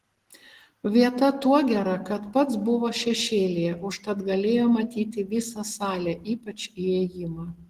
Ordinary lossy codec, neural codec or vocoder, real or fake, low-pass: Opus, 16 kbps; none; real; 14.4 kHz